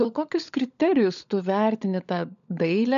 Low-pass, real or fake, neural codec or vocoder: 7.2 kHz; fake; codec, 16 kHz, 16 kbps, FunCodec, trained on LibriTTS, 50 frames a second